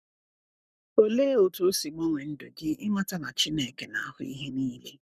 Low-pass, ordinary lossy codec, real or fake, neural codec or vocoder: 14.4 kHz; none; fake; codec, 44.1 kHz, 7.8 kbps, Pupu-Codec